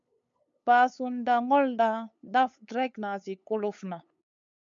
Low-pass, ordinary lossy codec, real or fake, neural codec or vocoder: 7.2 kHz; MP3, 64 kbps; fake; codec, 16 kHz, 8 kbps, FunCodec, trained on LibriTTS, 25 frames a second